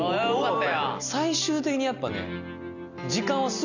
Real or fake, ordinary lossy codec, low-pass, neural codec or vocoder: real; none; 7.2 kHz; none